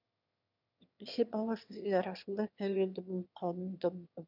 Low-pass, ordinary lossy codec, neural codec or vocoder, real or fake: 5.4 kHz; none; autoencoder, 22.05 kHz, a latent of 192 numbers a frame, VITS, trained on one speaker; fake